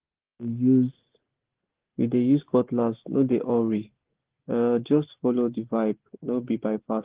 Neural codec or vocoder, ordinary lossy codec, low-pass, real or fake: none; Opus, 16 kbps; 3.6 kHz; real